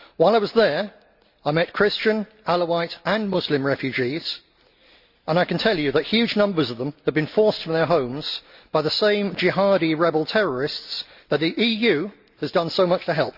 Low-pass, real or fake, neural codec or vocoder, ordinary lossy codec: 5.4 kHz; real; none; Opus, 64 kbps